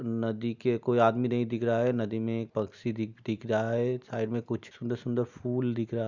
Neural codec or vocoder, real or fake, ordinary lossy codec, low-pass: none; real; Opus, 64 kbps; 7.2 kHz